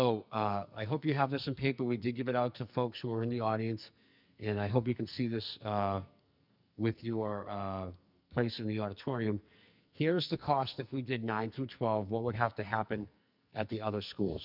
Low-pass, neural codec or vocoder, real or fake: 5.4 kHz; codec, 44.1 kHz, 2.6 kbps, SNAC; fake